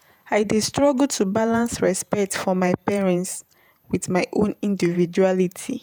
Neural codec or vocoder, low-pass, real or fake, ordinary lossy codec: vocoder, 48 kHz, 128 mel bands, Vocos; none; fake; none